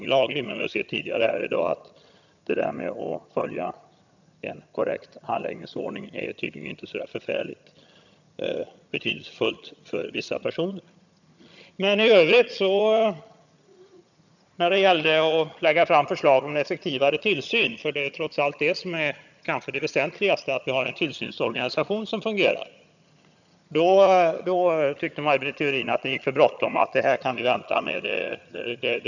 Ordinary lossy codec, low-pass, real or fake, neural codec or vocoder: none; 7.2 kHz; fake; vocoder, 22.05 kHz, 80 mel bands, HiFi-GAN